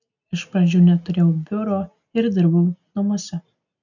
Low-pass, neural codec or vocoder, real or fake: 7.2 kHz; none; real